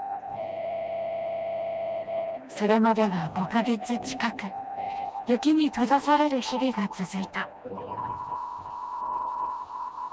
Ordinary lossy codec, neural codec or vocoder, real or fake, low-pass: none; codec, 16 kHz, 1 kbps, FreqCodec, smaller model; fake; none